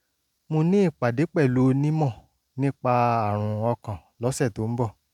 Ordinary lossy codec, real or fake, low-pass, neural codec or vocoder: none; real; 19.8 kHz; none